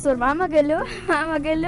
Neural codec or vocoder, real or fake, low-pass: vocoder, 24 kHz, 100 mel bands, Vocos; fake; 10.8 kHz